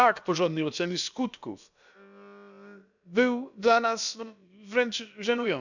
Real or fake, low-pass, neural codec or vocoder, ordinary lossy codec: fake; 7.2 kHz; codec, 16 kHz, about 1 kbps, DyCAST, with the encoder's durations; none